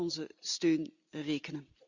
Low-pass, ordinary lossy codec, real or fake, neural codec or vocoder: 7.2 kHz; Opus, 64 kbps; real; none